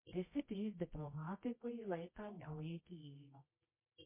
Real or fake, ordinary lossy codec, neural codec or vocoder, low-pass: fake; MP3, 24 kbps; codec, 24 kHz, 0.9 kbps, WavTokenizer, medium music audio release; 3.6 kHz